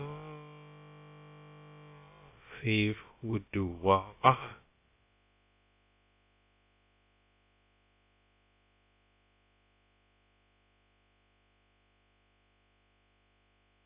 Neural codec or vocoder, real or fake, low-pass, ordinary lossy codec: codec, 16 kHz, about 1 kbps, DyCAST, with the encoder's durations; fake; 3.6 kHz; AAC, 24 kbps